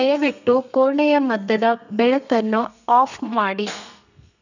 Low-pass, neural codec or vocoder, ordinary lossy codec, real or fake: 7.2 kHz; codec, 32 kHz, 1.9 kbps, SNAC; none; fake